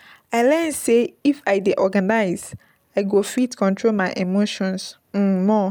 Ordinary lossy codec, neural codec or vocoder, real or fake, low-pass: none; none; real; none